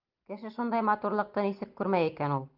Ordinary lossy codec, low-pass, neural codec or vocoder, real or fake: Opus, 24 kbps; 5.4 kHz; none; real